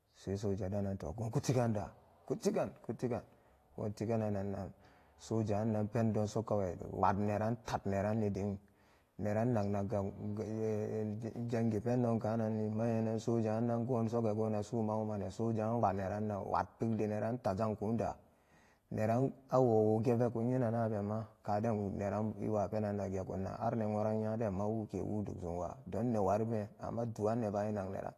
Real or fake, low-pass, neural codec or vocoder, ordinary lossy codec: fake; 14.4 kHz; vocoder, 48 kHz, 128 mel bands, Vocos; AAC, 48 kbps